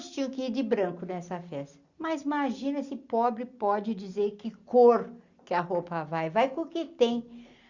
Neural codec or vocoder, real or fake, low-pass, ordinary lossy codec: none; real; 7.2 kHz; Opus, 64 kbps